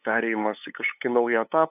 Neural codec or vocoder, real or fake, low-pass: codec, 16 kHz, 8 kbps, FunCodec, trained on LibriTTS, 25 frames a second; fake; 3.6 kHz